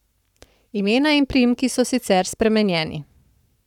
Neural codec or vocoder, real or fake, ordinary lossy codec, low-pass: codec, 44.1 kHz, 7.8 kbps, Pupu-Codec; fake; none; 19.8 kHz